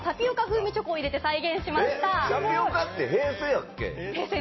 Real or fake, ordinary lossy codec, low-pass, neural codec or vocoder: real; MP3, 24 kbps; 7.2 kHz; none